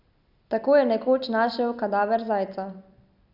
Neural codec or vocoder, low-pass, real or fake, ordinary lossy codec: none; 5.4 kHz; real; none